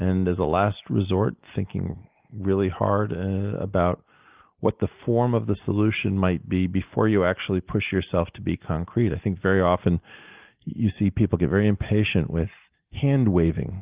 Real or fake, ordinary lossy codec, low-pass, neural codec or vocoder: real; Opus, 24 kbps; 3.6 kHz; none